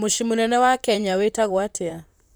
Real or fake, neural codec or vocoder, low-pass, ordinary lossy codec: fake; vocoder, 44.1 kHz, 128 mel bands, Pupu-Vocoder; none; none